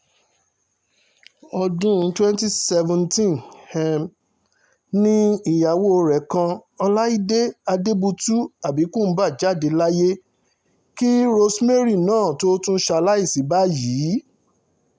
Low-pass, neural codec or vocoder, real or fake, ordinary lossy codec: none; none; real; none